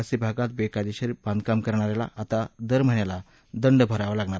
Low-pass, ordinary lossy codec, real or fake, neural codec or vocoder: none; none; real; none